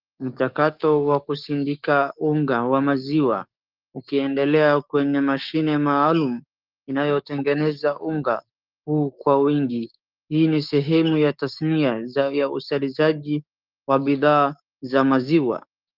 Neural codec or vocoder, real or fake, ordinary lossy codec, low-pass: codec, 16 kHz, 6 kbps, DAC; fake; Opus, 24 kbps; 5.4 kHz